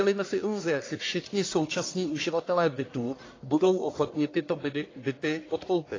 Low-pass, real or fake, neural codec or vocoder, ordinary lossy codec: 7.2 kHz; fake; codec, 44.1 kHz, 1.7 kbps, Pupu-Codec; AAC, 32 kbps